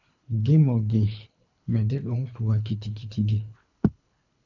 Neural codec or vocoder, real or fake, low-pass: codec, 24 kHz, 3 kbps, HILCodec; fake; 7.2 kHz